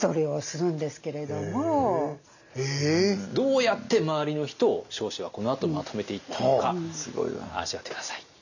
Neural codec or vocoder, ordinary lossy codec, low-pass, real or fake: none; none; 7.2 kHz; real